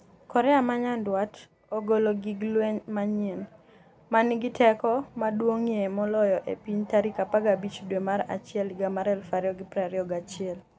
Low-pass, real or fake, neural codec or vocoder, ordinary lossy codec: none; real; none; none